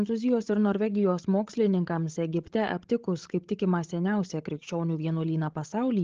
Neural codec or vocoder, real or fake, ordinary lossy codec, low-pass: codec, 16 kHz, 16 kbps, FreqCodec, larger model; fake; Opus, 16 kbps; 7.2 kHz